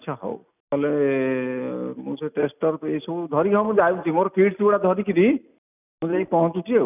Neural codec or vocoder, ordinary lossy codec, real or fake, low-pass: none; none; real; 3.6 kHz